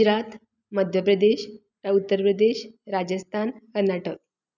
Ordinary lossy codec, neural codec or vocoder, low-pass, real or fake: none; none; 7.2 kHz; real